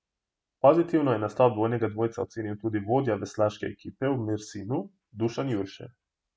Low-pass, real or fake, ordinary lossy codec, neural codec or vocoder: none; real; none; none